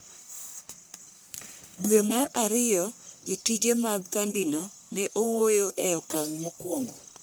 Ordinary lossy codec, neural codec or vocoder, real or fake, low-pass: none; codec, 44.1 kHz, 1.7 kbps, Pupu-Codec; fake; none